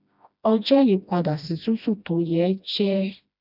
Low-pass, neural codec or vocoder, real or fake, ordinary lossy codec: 5.4 kHz; codec, 16 kHz, 1 kbps, FreqCodec, smaller model; fake; none